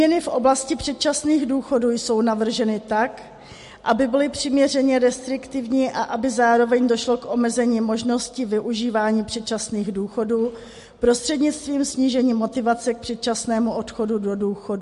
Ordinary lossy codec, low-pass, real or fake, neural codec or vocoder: MP3, 48 kbps; 14.4 kHz; real; none